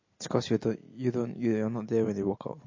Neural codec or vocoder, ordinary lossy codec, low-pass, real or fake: none; MP3, 32 kbps; 7.2 kHz; real